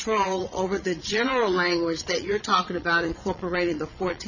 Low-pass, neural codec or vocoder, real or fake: 7.2 kHz; vocoder, 22.05 kHz, 80 mel bands, Vocos; fake